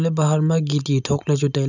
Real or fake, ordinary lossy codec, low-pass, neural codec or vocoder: real; none; 7.2 kHz; none